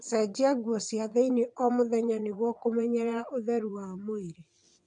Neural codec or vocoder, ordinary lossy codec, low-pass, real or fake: vocoder, 22.05 kHz, 80 mel bands, WaveNeXt; MP3, 64 kbps; 9.9 kHz; fake